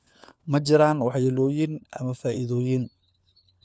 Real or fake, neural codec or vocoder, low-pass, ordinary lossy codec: fake; codec, 16 kHz, 4 kbps, FunCodec, trained on LibriTTS, 50 frames a second; none; none